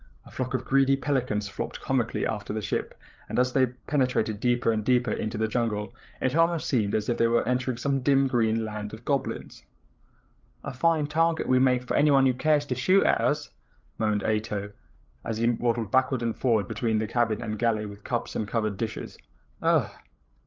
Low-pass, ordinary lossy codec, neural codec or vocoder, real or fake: 7.2 kHz; Opus, 32 kbps; codec, 16 kHz, 8 kbps, FreqCodec, larger model; fake